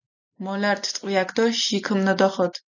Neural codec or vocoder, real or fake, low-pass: none; real; 7.2 kHz